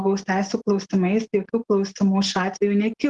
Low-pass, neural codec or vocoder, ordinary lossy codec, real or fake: 10.8 kHz; none; Opus, 16 kbps; real